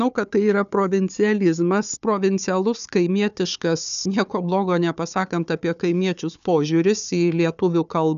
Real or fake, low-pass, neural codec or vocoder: fake; 7.2 kHz; codec, 16 kHz, 4 kbps, FunCodec, trained on Chinese and English, 50 frames a second